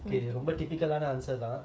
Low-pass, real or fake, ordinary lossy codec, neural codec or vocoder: none; fake; none; codec, 16 kHz, 8 kbps, FreqCodec, smaller model